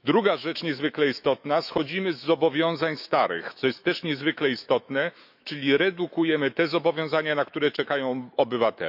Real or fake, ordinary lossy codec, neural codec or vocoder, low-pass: fake; none; autoencoder, 48 kHz, 128 numbers a frame, DAC-VAE, trained on Japanese speech; 5.4 kHz